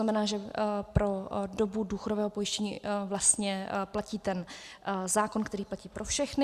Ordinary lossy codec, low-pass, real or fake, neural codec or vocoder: Opus, 64 kbps; 14.4 kHz; real; none